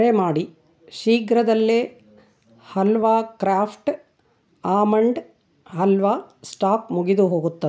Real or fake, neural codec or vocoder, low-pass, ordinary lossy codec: real; none; none; none